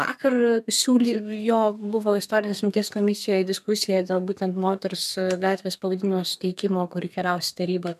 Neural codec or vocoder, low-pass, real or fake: codec, 32 kHz, 1.9 kbps, SNAC; 14.4 kHz; fake